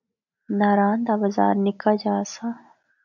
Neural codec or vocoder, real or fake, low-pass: none; real; 7.2 kHz